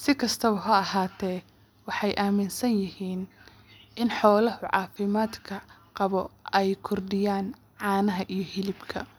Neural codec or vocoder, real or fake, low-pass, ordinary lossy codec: none; real; none; none